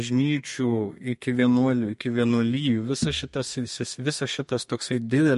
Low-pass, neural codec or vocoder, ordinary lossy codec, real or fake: 14.4 kHz; codec, 32 kHz, 1.9 kbps, SNAC; MP3, 48 kbps; fake